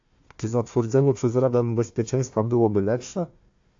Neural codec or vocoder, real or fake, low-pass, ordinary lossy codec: codec, 16 kHz, 1 kbps, FunCodec, trained on Chinese and English, 50 frames a second; fake; 7.2 kHz; AAC, 48 kbps